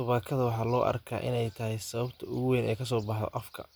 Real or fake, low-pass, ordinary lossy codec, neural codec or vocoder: real; none; none; none